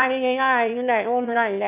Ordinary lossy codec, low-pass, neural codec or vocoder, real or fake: none; 3.6 kHz; autoencoder, 22.05 kHz, a latent of 192 numbers a frame, VITS, trained on one speaker; fake